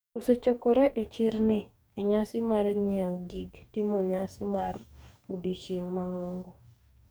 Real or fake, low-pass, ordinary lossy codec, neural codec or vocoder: fake; none; none; codec, 44.1 kHz, 2.6 kbps, DAC